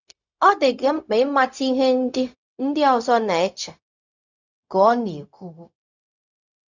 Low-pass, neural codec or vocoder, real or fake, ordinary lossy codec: 7.2 kHz; codec, 16 kHz, 0.4 kbps, LongCat-Audio-Codec; fake; none